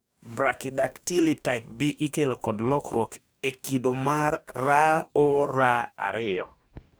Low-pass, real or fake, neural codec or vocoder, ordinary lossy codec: none; fake; codec, 44.1 kHz, 2.6 kbps, DAC; none